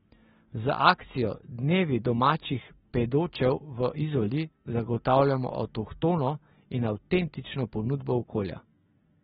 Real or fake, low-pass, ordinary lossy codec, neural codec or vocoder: real; 19.8 kHz; AAC, 16 kbps; none